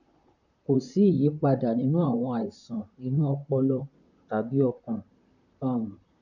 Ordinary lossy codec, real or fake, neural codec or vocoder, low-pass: none; fake; vocoder, 44.1 kHz, 128 mel bands, Pupu-Vocoder; 7.2 kHz